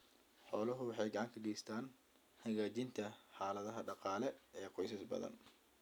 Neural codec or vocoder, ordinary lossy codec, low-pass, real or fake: none; none; none; real